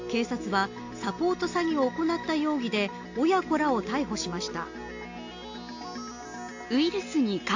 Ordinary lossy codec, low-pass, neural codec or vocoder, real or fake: AAC, 48 kbps; 7.2 kHz; none; real